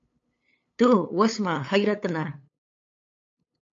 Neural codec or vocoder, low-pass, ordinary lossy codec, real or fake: codec, 16 kHz, 8 kbps, FunCodec, trained on LibriTTS, 25 frames a second; 7.2 kHz; AAC, 48 kbps; fake